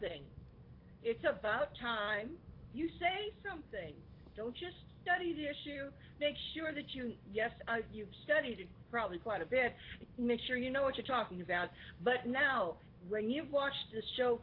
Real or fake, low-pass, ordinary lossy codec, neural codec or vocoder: real; 5.4 kHz; AAC, 32 kbps; none